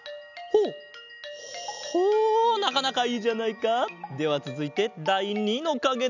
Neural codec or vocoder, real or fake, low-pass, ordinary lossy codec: none; real; 7.2 kHz; none